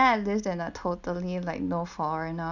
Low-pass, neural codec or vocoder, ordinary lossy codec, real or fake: 7.2 kHz; none; none; real